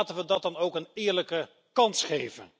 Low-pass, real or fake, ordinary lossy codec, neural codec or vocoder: none; real; none; none